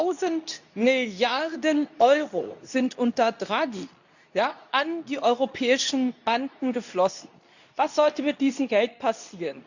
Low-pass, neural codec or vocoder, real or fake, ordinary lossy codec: 7.2 kHz; codec, 24 kHz, 0.9 kbps, WavTokenizer, medium speech release version 2; fake; none